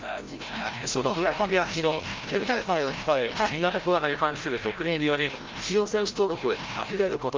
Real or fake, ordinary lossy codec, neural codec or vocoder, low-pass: fake; Opus, 32 kbps; codec, 16 kHz, 0.5 kbps, FreqCodec, larger model; 7.2 kHz